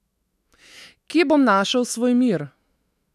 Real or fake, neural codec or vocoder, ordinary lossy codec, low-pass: fake; autoencoder, 48 kHz, 128 numbers a frame, DAC-VAE, trained on Japanese speech; none; 14.4 kHz